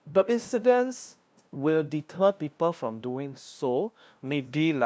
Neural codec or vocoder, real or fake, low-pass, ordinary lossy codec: codec, 16 kHz, 0.5 kbps, FunCodec, trained on LibriTTS, 25 frames a second; fake; none; none